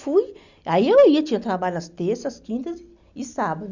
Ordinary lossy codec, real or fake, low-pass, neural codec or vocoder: Opus, 64 kbps; real; 7.2 kHz; none